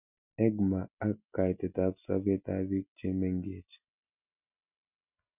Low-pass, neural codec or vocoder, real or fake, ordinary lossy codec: 3.6 kHz; none; real; none